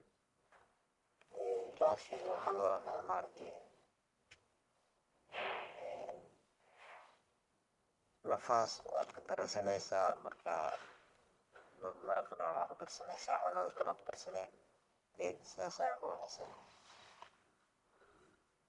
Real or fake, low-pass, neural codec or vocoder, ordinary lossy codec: fake; 10.8 kHz; codec, 44.1 kHz, 1.7 kbps, Pupu-Codec; none